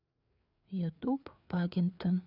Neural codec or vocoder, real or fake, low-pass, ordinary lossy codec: codec, 16 kHz, 4 kbps, FreqCodec, larger model; fake; 5.4 kHz; none